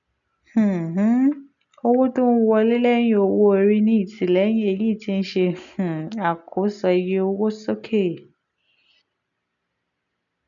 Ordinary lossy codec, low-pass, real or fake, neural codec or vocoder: none; 7.2 kHz; real; none